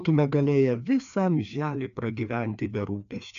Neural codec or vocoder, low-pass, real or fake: codec, 16 kHz, 2 kbps, FreqCodec, larger model; 7.2 kHz; fake